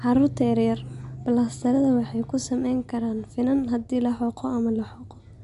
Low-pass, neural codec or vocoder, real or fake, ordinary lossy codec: 10.8 kHz; none; real; MP3, 64 kbps